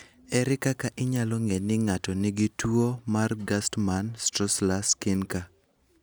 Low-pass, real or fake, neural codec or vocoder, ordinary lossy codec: none; real; none; none